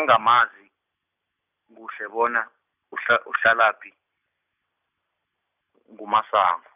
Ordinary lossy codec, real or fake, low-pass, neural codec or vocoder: none; real; 3.6 kHz; none